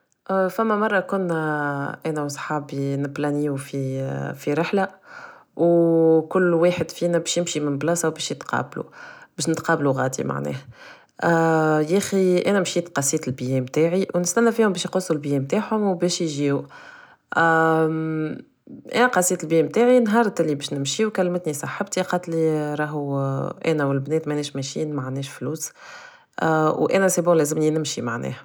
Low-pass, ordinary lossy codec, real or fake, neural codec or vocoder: none; none; real; none